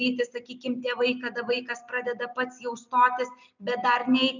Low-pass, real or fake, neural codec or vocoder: 7.2 kHz; real; none